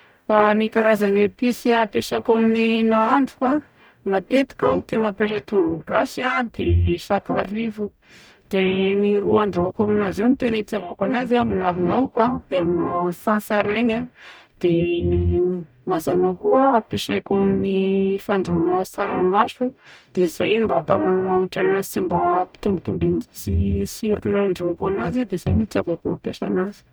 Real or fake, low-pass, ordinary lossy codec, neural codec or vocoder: fake; none; none; codec, 44.1 kHz, 0.9 kbps, DAC